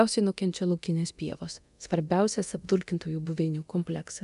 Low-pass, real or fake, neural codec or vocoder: 10.8 kHz; fake; codec, 24 kHz, 0.9 kbps, DualCodec